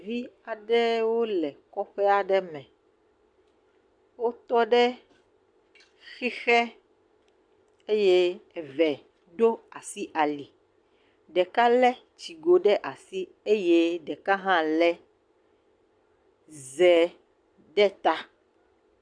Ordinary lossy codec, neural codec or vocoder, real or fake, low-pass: AAC, 64 kbps; none; real; 9.9 kHz